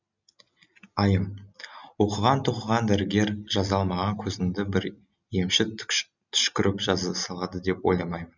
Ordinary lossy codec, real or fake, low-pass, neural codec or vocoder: none; real; 7.2 kHz; none